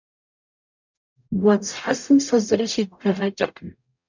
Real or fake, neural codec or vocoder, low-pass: fake; codec, 44.1 kHz, 0.9 kbps, DAC; 7.2 kHz